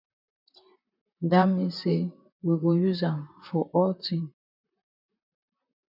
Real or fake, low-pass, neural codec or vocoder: fake; 5.4 kHz; vocoder, 44.1 kHz, 128 mel bands, Pupu-Vocoder